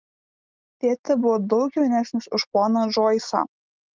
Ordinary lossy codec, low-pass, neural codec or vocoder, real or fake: Opus, 24 kbps; 7.2 kHz; none; real